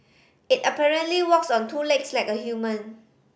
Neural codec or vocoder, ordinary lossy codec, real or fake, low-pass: none; none; real; none